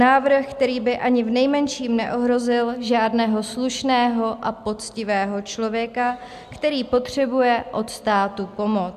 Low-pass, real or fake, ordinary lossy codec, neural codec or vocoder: 14.4 kHz; real; AAC, 96 kbps; none